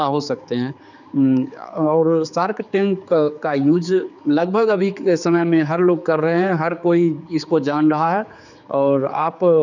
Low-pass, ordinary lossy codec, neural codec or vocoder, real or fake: 7.2 kHz; none; codec, 16 kHz, 4 kbps, X-Codec, HuBERT features, trained on general audio; fake